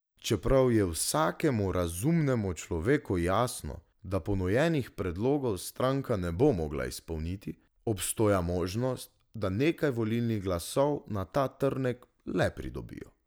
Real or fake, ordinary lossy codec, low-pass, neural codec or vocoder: real; none; none; none